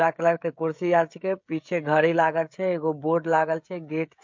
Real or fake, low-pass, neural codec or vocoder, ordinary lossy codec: fake; 7.2 kHz; codec, 16 kHz, 16 kbps, FreqCodec, smaller model; MP3, 48 kbps